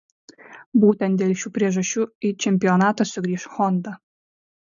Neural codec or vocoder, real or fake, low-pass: none; real; 7.2 kHz